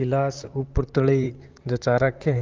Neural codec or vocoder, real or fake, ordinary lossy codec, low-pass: vocoder, 44.1 kHz, 128 mel bands, Pupu-Vocoder; fake; Opus, 24 kbps; 7.2 kHz